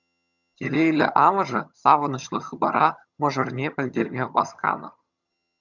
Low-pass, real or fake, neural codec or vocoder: 7.2 kHz; fake; vocoder, 22.05 kHz, 80 mel bands, HiFi-GAN